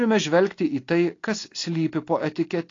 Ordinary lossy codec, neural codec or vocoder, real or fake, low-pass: AAC, 32 kbps; none; real; 7.2 kHz